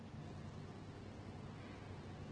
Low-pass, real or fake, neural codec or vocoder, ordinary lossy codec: 9.9 kHz; real; none; Opus, 16 kbps